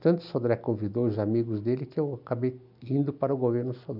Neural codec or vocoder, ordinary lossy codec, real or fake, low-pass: none; none; real; 5.4 kHz